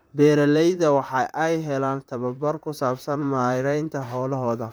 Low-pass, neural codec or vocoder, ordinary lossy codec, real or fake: none; vocoder, 44.1 kHz, 128 mel bands, Pupu-Vocoder; none; fake